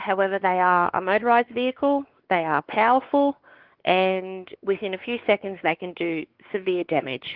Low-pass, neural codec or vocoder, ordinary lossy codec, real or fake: 5.4 kHz; codec, 16 kHz, 4 kbps, X-Codec, WavLM features, trained on Multilingual LibriSpeech; Opus, 16 kbps; fake